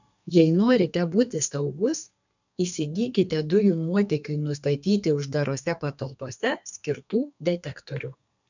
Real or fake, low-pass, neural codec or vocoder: fake; 7.2 kHz; codec, 32 kHz, 1.9 kbps, SNAC